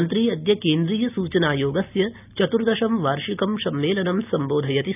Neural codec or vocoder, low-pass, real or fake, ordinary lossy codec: none; 3.6 kHz; real; none